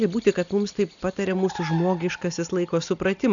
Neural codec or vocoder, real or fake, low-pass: none; real; 7.2 kHz